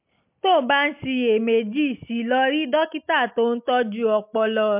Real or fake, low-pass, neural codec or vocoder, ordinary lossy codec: real; 3.6 kHz; none; MP3, 32 kbps